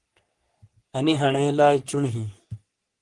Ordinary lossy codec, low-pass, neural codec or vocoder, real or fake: Opus, 24 kbps; 10.8 kHz; codec, 44.1 kHz, 3.4 kbps, Pupu-Codec; fake